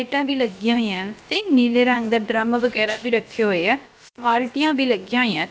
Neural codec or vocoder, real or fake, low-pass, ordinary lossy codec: codec, 16 kHz, about 1 kbps, DyCAST, with the encoder's durations; fake; none; none